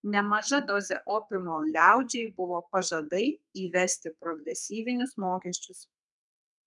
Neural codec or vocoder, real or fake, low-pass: codec, 32 kHz, 1.9 kbps, SNAC; fake; 10.8 kHz